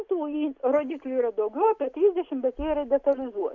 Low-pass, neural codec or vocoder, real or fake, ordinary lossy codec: 7.2 kHz; none; real; Opus, 64 kbps